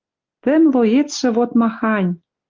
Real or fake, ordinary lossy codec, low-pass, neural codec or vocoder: real; Opus, 32 kbps; 7.2 kHz; none